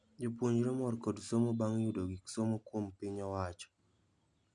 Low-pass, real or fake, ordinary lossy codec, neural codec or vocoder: 9.9 kHz; real; none; none